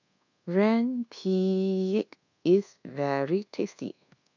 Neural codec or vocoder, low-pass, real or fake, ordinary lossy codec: codec, 24 kHz, 1.2 kbps, DualCodec; 7.2 kHz; fake; none